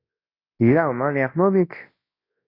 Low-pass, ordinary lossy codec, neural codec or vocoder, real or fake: 5.4 kHz; AAC, 32 kbps; codec, 24 kHz, 0.9 kbps, WavTokenizer, large speech release; fake